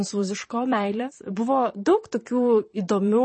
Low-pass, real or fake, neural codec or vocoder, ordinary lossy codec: 10.8 kHz; fake; vocoder, 44.1 kHz, 128 mel bands, Pupu-Vocoder; MP3, 32 kbps